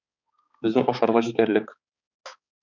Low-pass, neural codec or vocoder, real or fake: 7.2 kHz; codec, 16 kHz, 4 kbps, X-Codec, HuBERT features, trained on balanced general audio; fake